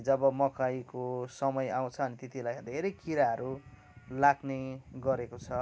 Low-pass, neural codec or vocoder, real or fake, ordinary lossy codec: none; none; real; none